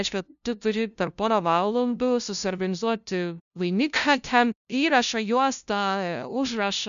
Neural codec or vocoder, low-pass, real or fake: codec, 16 kHz, 0.5 kbps, FunCodec, trained on LibriTTS, 25 frames a second; 7.2 kHz; fake